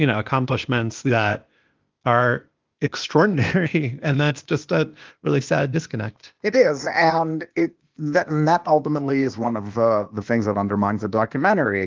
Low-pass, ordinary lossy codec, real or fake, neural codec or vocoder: 7.2 kHz; Opus, 32 kbps; fake; codec, 16 kHz, 0.8 kbps, ZipCodec